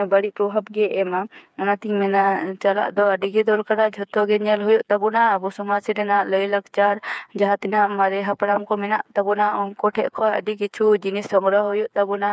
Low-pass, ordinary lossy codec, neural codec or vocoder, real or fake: none; none; codec, 16 kHz, 4 kbps, FreqCodec, smaller model; fake